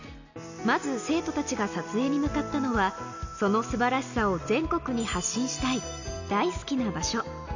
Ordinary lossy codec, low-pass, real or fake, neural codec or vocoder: none; 7.2 kHz; real; none